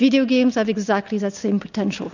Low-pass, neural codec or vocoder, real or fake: 7.2 kHz; none; real